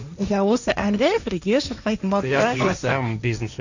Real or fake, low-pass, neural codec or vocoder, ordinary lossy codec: fake; 7.2 kHz; codec, 16 kHz, 1.1 kbps, Voila-Tokenizer; none